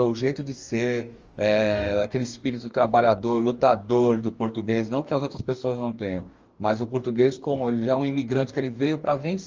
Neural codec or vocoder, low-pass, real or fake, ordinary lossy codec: codec, 44.1 kHz, 2.6 kbps, DAC; 7.2 kHz; fake; Opus, 32 kbps